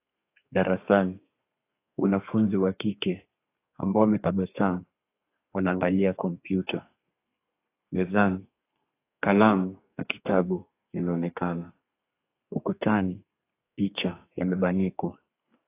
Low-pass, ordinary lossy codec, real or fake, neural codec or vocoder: 3.6 kHz; AAC, 24 kbps; fake; codec, 32 kHz, 1.9 kbps, SNAC